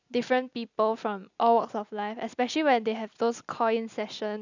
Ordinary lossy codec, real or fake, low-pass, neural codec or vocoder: none; real; 7.2 kHz; none